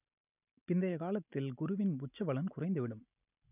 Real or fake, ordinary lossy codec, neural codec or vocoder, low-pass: real; none; none; 3.6 kHz